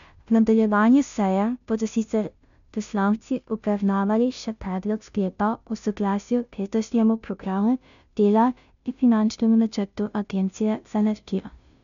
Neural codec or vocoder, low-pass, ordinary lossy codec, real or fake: codec, 16 kHz, 0.5 kbps, FunCodec, trained on Chinese and English, 25 frames a second; 7.2 kHz; none; fake